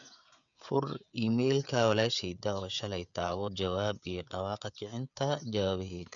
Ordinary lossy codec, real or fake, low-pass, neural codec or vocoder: none; fake; 7.2 kHz; codec, 16 kHz, 16 kbps, FreqCodec, smaller model